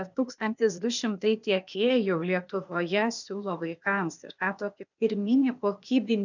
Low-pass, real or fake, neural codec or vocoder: 7.2 kHz; fake; codec, 16 kHz, 0.8 kbps, ZipCodec